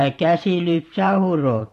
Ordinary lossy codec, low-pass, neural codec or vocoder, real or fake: AAC, 48 kbps; 14.4 kHz; vocoder, 44.1 kHz, 128 mel bands every 512 samples, BigVGAN v2; fake